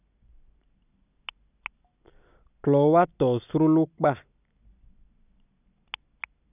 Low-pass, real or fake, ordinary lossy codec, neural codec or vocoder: 3.6 kHz; real; none; none